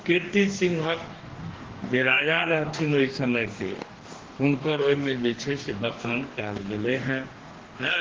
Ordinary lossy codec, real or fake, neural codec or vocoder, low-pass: Opus, 16 kbps; fake; codec, 44.1 kHz, 2.6 kbps, DAC; 7.2 kHz